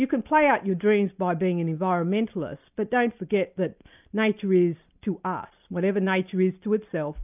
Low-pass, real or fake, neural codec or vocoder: 3.6 kHz; real; none